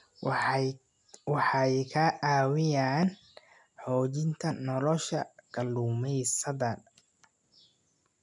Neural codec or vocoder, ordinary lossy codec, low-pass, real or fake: none; none; 10.8 kHz; real